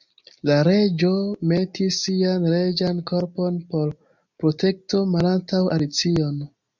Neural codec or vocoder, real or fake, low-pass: none; real; 7.2 kHz